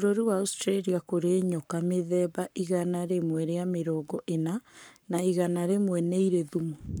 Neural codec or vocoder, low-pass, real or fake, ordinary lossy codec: codec, 44.1 kHz, 7.8 kbps, Pupu-Codec; none; fake; none